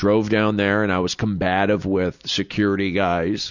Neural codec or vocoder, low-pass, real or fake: none; 7.2 kHz; real